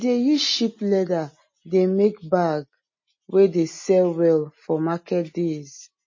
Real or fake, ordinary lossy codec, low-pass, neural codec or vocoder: real; MP3, 32 kbps; 7.2 kHz; none